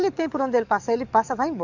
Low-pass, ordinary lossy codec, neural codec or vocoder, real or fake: 7.2 kHz; none; codec, 44.1 kHz, 7.8 kbps, DAC; fake